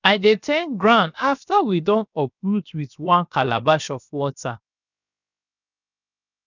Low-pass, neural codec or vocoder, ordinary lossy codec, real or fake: 7.2 kHz; codec, 16 kHz, 0.7 kbps, FocalCodec; none; fake